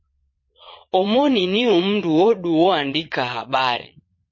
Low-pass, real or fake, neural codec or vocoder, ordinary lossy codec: 7.2 kHz; fake; vocoder, 22.05 kHz, 80 mel bands, Vocos; MP3, 32 kbps